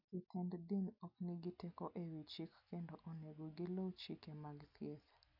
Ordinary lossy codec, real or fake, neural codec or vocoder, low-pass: none; real; none; 5.4 kHz